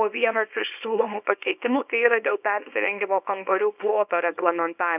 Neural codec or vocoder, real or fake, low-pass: codec, 24 kHz, 0.9 kbps, WavTokenizer, small release; fake; 3.6 kHz